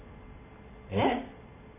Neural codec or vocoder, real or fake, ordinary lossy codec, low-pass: none; real; none; 3.6 kHz